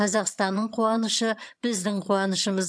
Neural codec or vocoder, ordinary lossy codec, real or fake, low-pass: vocoder, 22.05 kHz, 80 mel bands, HiFi-GAN; none; fake; none